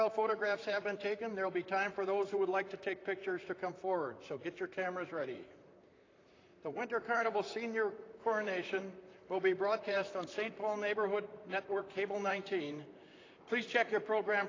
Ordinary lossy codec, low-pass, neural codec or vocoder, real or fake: AAC, 32 kbps; 7.2 kHz; vocoder, 44.1 kHz, 128 mel bands, Pupu-Vocoder; fake